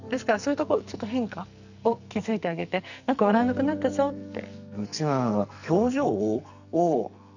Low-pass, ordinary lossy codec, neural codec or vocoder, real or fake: 7.2 kHz; none; codec, 44.1 kHz, 2.6 kbps, SNAC; fake